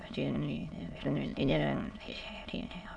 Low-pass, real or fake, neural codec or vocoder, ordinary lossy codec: 9.9 kHz; fake; autoencoder, 22.05 kHz, a latent of 192 numbers a frame, VITS, trained on many speakers; none